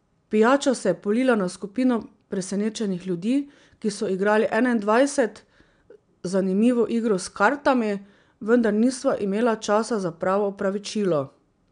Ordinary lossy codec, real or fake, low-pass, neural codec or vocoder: none; real; 9.9 kHz; none